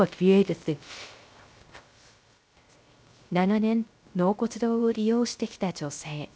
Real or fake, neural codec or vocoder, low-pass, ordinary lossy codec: fake; codec, 16 kHz, 0.3 kbps, FocalCodec; none; none